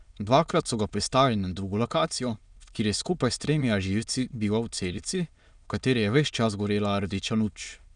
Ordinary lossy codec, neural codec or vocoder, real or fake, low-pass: none; autoencoder, 22.05 kHz, a latent of 192 numbers a frame, VITS, trained on many speakers; fake; 9.9 kHz